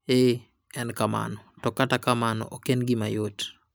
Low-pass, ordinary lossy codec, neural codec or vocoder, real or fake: none; none; none; real